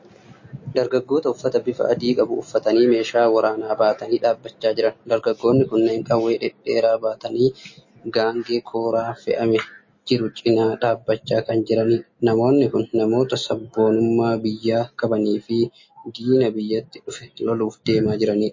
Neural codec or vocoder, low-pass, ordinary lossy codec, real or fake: none; 7.2 kHz; MP3, 32 kbps; real